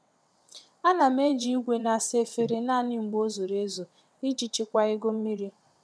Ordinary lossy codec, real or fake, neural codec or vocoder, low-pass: none; fake; vocoder, 22.05 kHz, 80 mel bands, WaveNeXt; none